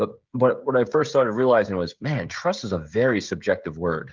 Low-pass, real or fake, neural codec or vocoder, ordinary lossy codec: 7.2 kHz; fake; codec, 16 kHz, 16 kbps, FreqCodec, smaller model; Opus, 32 kbps